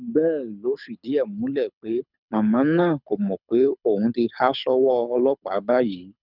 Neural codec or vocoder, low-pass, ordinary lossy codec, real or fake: codec, 24 kHz, 6 kbps, HILCodec; 5.4 kHz; none; fake